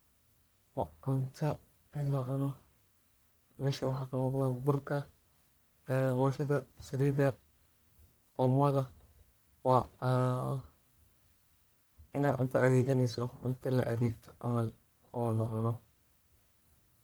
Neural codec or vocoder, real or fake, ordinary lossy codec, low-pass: codec, 44.1 kHz, 1.7 kbps, Pupu-Codec; fake; none; none